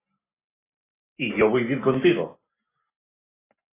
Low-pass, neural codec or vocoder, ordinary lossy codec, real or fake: 3.6 kHz; none; AAC, 16 kbps; real